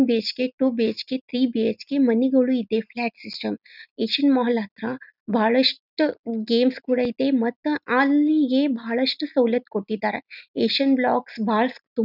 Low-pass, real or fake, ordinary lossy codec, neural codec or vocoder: 5.4 kHz; real; none; none